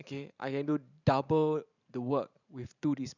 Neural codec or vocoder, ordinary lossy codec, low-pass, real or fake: vocoder, 44.1 kHz, 128 mel bands every 512 samples, BigVGAN v2; none; 7.2 kHz; fake